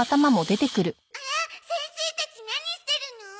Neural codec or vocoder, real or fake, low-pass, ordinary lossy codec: none; real; none; none